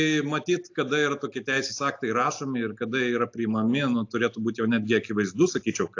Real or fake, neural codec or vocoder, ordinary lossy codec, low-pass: real; none; AAC, 48 kbps; 7.2 kHz